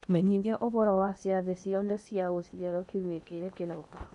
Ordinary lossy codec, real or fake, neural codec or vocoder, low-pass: none; fake; codec, 16 kHz in and 24 kHz out, 0.8 kbps, FocalCodec, streaming, 65536 codes; 10.8 kHz